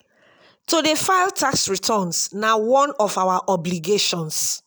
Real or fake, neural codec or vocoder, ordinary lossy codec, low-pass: real; none; none; none